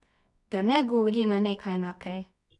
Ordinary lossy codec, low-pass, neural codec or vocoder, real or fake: none; 10.8 kHz; codec, 24 kHz, 0.9 kbps, WavTokenizer, medium music audio release; fake